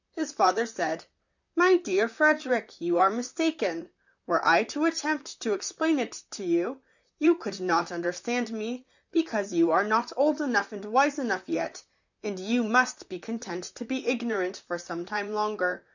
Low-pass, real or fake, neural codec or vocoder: 7.2 kHz; fake; vocoder, 44.1 kHz, 128 mel bands, Pupu-Vocoder